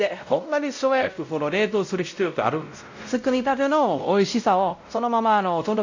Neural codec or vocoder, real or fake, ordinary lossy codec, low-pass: codec, 16 kHz, 0.5 kbps, X-Codec, WavLM features, trained on Multilingual LibriSpeech; fake; AAC, 48 kbps; 7.2 kHz